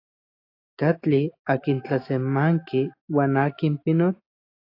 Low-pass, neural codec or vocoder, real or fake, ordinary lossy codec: 5.4 kHz; none; real; AAC, 32 kbps